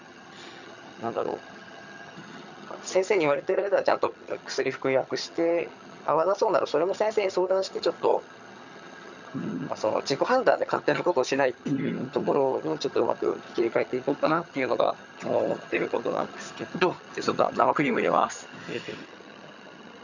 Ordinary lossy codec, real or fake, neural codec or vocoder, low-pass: none; fake; vocoder, 22.05 kHz, 80 mel bands, HiFi-GAN; 7.2 kHz